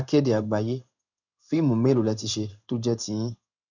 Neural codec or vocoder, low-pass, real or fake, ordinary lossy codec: codec, 16 kHz in and 24 kHz out, 1 kbps, XY-Tokenizer; 7.2 kHz; fake; none